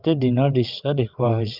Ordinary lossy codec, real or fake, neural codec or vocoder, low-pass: Opus, 24 kbps; fake; vocoder, 22.05 kHz, 80 mel bands, WaveNeXt; 5.4 kHz